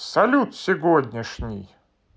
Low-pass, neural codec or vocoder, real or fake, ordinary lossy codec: none; none; real; none